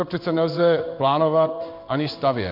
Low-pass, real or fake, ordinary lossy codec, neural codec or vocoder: 5.4 kHz; fake; MP3, 48 kbps; codec, 16 kHz in and 24 kHz out, 1 kbps, XY-Tokenizer